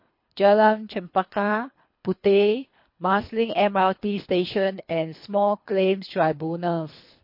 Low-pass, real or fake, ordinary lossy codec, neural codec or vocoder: 5.4 kHz; fake; MP3, 32 kbps; codec, 24 kHz, 3 kbps, HILCodec